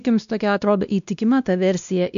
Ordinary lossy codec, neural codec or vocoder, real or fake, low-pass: MP3, 96 kbps; codec, 16 kHz, 1 kbps, X-Codec, WavLM features, trained on Multilingual LibriSpeech; fake; 7.2 kHz